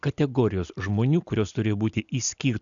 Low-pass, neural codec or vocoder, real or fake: 7.2 kHz; none; real